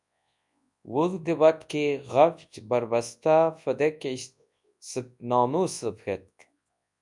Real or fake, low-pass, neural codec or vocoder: fake; 10.8 kHz; codec, 24 kHz, 0.9 kbps, WavTokenizer, large speech release